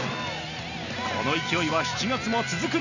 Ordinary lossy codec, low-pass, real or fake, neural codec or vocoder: none; 7.2 kHz; real; none